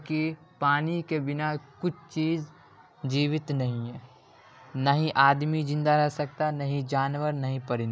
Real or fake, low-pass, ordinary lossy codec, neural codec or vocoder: real; none; none; none